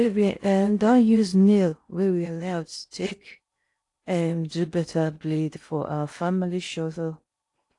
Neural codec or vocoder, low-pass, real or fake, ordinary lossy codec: codec, 16 kHz in and 24 kHz out, 0.6 kbps, FocalCodec, streaming, 4096 codes; 10.8 kHz; fake; AAC, 48 kbps